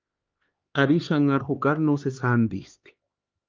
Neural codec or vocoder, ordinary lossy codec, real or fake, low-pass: codec, 16 kHz, 2 kbps, X-Codec, HuBERT features, trained on LibriSpeech; Opus, 24 kbps; fake; 7.2 kHz